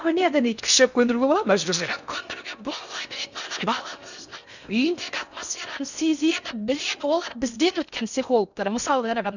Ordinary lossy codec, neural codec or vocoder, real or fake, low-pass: none; codec, 16 kHz in and 24 kHz out, 0.6 kbps, FocalCodec, streaming, 4096 codes; fake; 7.2 kHz